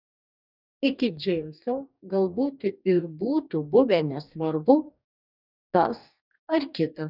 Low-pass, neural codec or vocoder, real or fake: 5.4 kHz; codec, 44.1 kHz, 2.6 kbps, DAC; fake